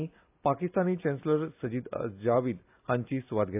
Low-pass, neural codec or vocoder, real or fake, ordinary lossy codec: 3.6 kHz; none; real; AAC, 32 kbps